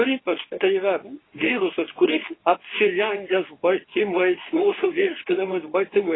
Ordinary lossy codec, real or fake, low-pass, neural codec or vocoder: AAC, 16 kbps; fake; 7.2 kHz; codec, 24 kHz, 0.9 kbps, WavTokenizer, medium speech release version 2